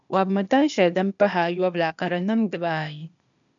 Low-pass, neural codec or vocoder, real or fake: 7.2 kHz; codec, 16 kHz, 0.8 kbps, ZipCodec; fake